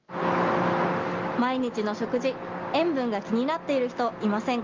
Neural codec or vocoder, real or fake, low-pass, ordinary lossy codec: none; real; 7.2 kHz; Opus, 32 kbps